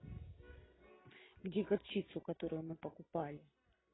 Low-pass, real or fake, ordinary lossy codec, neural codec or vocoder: 7.2 kHz; real; AAC, 16 kbps; none